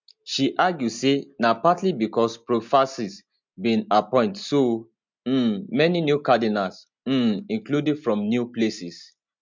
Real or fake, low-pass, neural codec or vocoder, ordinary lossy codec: real; 7.2 kHz; none; MP3, 64 kbps